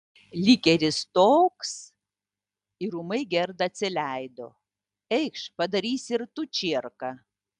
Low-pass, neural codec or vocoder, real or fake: 10.8 kHz; none; real